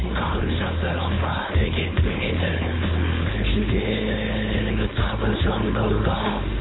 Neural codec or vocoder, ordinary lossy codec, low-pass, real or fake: codec, 16 kHz, 4.8 kbps, FACodec; AAC, 16 kbps; 7.2 kHz; fake